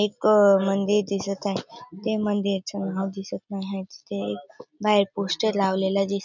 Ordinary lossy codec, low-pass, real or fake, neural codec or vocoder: none; 7.2 kHz; real; none